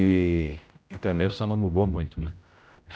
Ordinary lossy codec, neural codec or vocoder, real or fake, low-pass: none; codec, 16 kHz, 0.5 kbps, X-Codec, HuBERT features, trained on general audio; fake; none